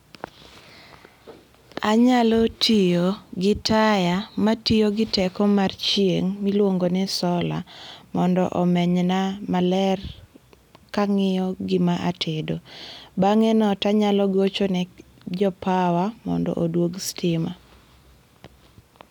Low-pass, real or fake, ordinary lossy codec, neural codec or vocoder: 19.8 kHz; real; none; none